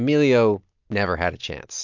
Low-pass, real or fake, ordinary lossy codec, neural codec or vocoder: 7.2 kHz; real; MP3, 64 kbps; none